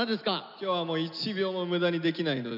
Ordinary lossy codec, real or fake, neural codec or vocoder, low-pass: none; real; none; 5.4 kHz